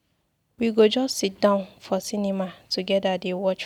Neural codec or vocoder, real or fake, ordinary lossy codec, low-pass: none; real; none; 19.8 kHz